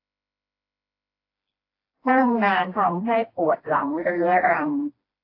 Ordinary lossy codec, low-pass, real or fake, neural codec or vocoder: AAC, 32 kbps; 5.4 kHz; fake; codec, 16 kHz, 1 kbps, FreqCodec, smaller model